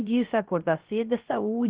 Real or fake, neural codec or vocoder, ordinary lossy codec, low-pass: fake; codec, 16 kHz, 0.3 kbps, FocalCodec; Opus, 16 kbps; 3.6 kHz